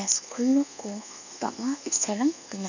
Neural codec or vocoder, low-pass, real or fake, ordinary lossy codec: codec, 24 kHz, 1.2 kbps, DualCodec; 7.2 kHz; fake; none